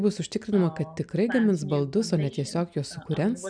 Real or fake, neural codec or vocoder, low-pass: real; none; 9.9 kHz